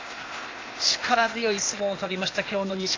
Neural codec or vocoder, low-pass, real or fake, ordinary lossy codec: codec, 16 kHz, 0.8 kbps, ZipCodec; 7.2 kHz; fake; AAC, 32 kbps